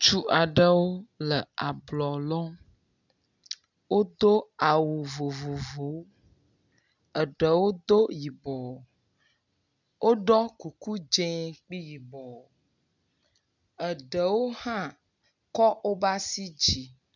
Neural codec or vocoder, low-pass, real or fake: none; 7.2 kHz; real